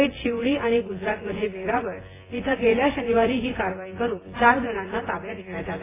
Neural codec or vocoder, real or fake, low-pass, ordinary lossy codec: vocoder, 24 kHz, 100 mel bands, Vocos; fake; 3.6 kHz; AAC, 16 kbps